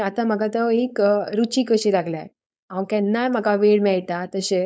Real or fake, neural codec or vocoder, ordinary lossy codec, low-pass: fake; codec, 16 kHz, 4.8 kbps, FACodec; none; none